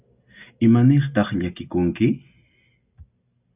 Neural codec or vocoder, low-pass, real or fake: none; 3.6 kHz; real